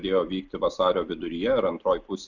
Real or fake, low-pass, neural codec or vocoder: real; 7.2 kHz; none